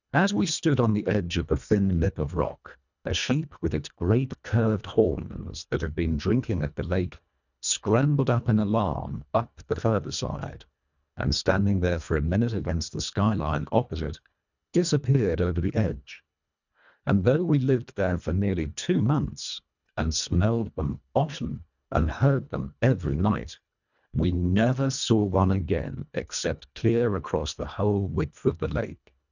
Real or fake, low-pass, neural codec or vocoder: fake; 7.2 kHz; codec, 24 kHz, 1.5 kbps, HILCodec